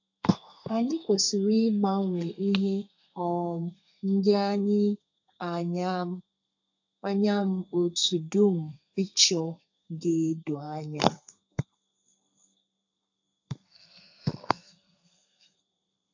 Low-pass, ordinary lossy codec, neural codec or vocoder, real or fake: 7.2 kHz; AAC, 48 kbps; codec, 32 kHz, 1.9 kbps, SNAC; fake